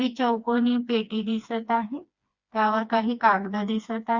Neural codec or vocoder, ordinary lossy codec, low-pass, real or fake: codec, 16 kHz, 2 kbps, FreqCodec, smaller model; Opus, 64 kbps; 7.2 kHz; fake